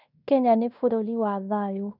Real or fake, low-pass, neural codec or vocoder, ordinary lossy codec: fake; 5.4 kHz; codec, 16 kHz in and 24 kHz out, 0.9 kbps, LongCat-Audio-Codec, fine tuned four codebook decoder; none